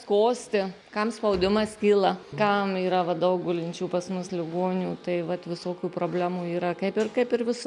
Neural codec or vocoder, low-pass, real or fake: none; 10.8 kHz; real